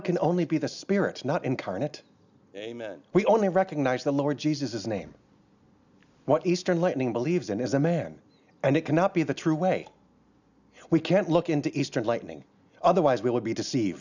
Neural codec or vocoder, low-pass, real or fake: none; 7.2 kHz; real